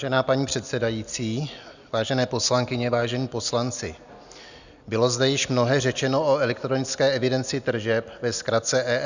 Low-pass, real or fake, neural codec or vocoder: 7.2 kHz; real; none